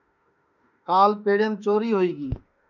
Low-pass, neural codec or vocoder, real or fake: 7.2 kHz; autoencoder, 48 kHz, 32 numbers a frame, DAC-VAE, trained on Japanese speech; fake